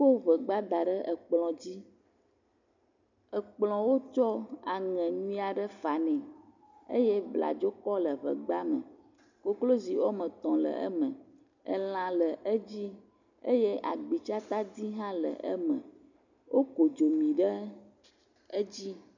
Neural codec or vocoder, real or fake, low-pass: none; real; 7.2 kHz